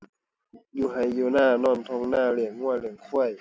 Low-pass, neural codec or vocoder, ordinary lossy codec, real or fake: none; none; none; real